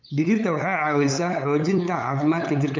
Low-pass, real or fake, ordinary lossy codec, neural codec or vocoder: 7.2 kHz; fake; MP3, 64 kbps; codec, 16 kHz, 8 kbps, FunCodec, trained on LibriTTS, 25 frames a second